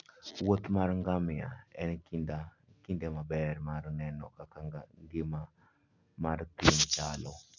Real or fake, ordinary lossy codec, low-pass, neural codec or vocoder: real; none; 7.2 kHz; none